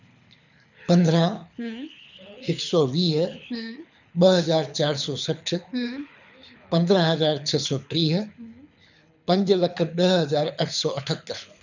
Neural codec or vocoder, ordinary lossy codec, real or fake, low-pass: codec, 24 kHz, 6 kbps, HILCodec; none; fake; 7.2 kHz